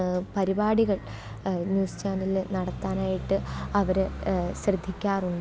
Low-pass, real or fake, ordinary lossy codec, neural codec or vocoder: none; real; none; none